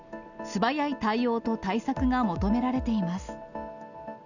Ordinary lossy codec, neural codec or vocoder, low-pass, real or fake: none; none; 7.2 kHz; real